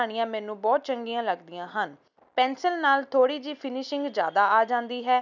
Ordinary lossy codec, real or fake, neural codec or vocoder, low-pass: none; real; none; 7.2 kHz